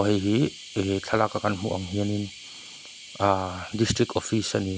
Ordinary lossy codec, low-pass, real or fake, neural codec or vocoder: none; none; real; none